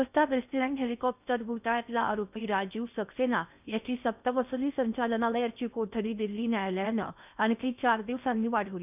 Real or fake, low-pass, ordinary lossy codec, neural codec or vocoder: fake; 3.6 kHz; none; codec, 16 kHz in and 24 kHz out, 0.6 kbps, FocalCodec, streaming, 4096 codes